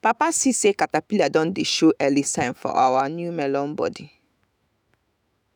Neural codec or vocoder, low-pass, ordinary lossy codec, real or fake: autoencoder, 48 kHz, 128 numbers a frame, DAC-VAE, trained on Japanese speech; none; none; fake